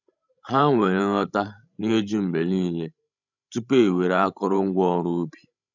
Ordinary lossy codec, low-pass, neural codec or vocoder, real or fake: none; 7.2 kHz; codec, 16 kHz, 16 kbps, FreqCodec, larger model; fake